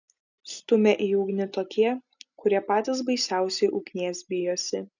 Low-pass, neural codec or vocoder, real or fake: 7.2 kHz; none; real